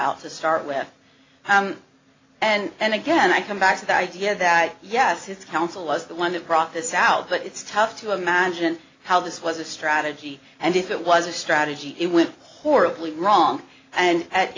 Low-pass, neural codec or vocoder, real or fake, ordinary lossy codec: 7.2 kHz; none; real; AAC, 32 kbps